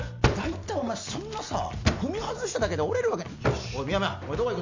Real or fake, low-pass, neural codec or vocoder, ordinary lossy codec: real; 7.2 kHz; none; MP3, 64 kbps